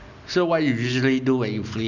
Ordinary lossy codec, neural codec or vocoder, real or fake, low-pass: none; none; real; 7.2 kHz